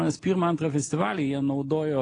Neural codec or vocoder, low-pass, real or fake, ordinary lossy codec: none; 9.9 kHz; real; AAC, 32 kbps